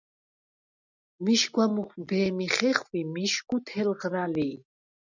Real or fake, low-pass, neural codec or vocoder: real; 7.2 kHz; none